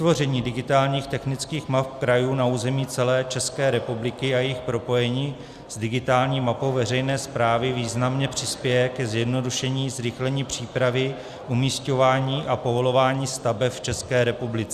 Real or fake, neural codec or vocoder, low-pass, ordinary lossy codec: real; none; 14.4 kHz; Opus, 64 kbps